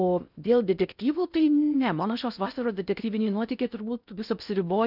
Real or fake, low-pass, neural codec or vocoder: fake; 5.4 kHz; codec, 16 kHz in and 24 kHz out, 0.6 kbps, FocalCodec, streaming, 4096 codes